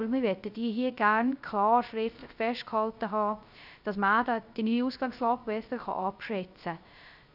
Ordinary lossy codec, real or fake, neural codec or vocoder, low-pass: none; fake; codec, 16 kHz, 0.3 kbps, FocalCodec; 5.4 kHz